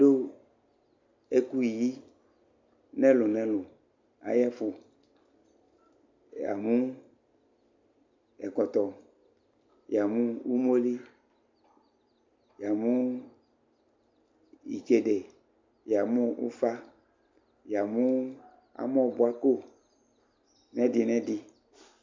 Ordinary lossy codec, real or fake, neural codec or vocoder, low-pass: MP3, 64 kbps; real; none; 7.2 kHz